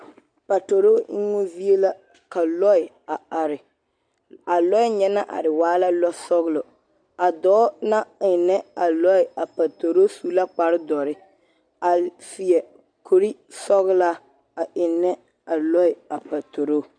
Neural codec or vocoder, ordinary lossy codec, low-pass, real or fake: none; MP3, 64 kbps; 9.9 kHz; real